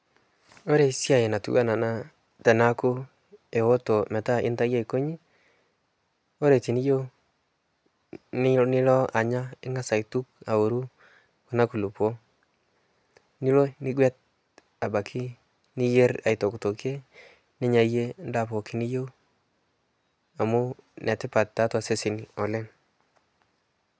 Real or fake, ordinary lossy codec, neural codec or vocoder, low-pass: real; none; none; none